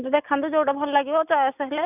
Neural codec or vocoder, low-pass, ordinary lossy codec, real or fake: none; 3.6 kHz; none; real